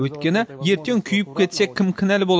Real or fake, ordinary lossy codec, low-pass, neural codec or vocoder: real; none; none; none